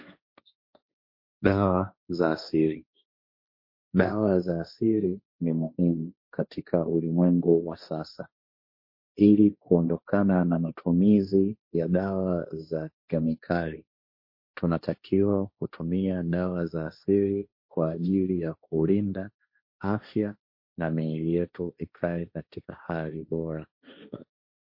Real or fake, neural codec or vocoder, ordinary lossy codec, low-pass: fake; codec, 16 kHz, 1.1 kbps, Voila-Tokenizer; MP3, 32 kbps; 5.4 kHz